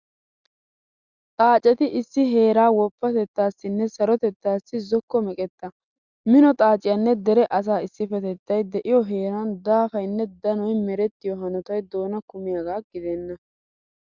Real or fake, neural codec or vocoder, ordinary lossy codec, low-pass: real; none; Opus, 64 kbps; 7.2 kHz